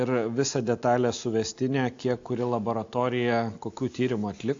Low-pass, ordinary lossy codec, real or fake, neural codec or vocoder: 7.2 kHz; AAC, 48 kbps; real; none